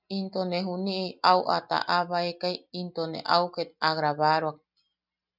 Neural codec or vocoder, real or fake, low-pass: none; real; 5.4 kHz